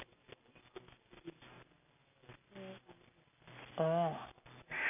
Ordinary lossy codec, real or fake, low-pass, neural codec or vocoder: none; real; 3.6 kHz; none